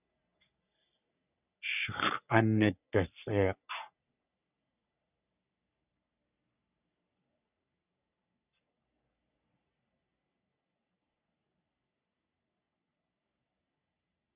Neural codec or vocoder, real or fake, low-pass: codec, 44.1 kHz, 3.4 kbps, Pupu-Codec; fake; 3.6 kHz